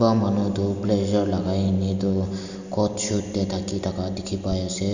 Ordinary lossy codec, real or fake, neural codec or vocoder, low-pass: none; real; none; 7.2 kHz